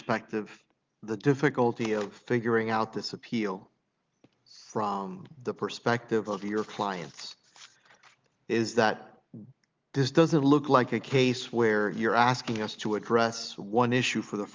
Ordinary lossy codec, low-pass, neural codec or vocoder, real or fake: Opus, 32 kbps; 7.2 kHz; none; real